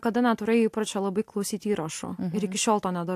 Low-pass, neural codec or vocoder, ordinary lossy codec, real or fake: 14.4 kHz; none; AAC, 64 kbps; real